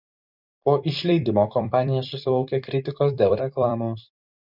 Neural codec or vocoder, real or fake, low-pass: vocoder, 44.1 kHz, 128 mel bands every 256 samples, BigVGAN v2; fake; 5.4 kHz